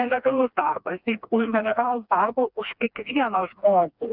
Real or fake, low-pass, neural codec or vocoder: fake; 5.4 kHz; codec, 16 kHz, 2 kbps, FreqCodec, smaller model